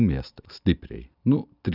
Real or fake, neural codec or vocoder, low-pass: real; none; 5.4 kHz